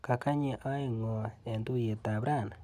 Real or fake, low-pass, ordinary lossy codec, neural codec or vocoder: real; 14.4 kHz; none; none